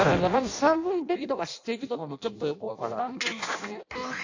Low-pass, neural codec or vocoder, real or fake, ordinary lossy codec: 7.2 kHz; codec, 16 kHz in and 24 kHz out, 0.6 kbps, FireRedTTS-2 codec; fake; AAC, 48 kbps